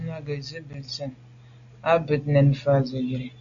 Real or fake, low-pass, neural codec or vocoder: real; 7.2 kHz; none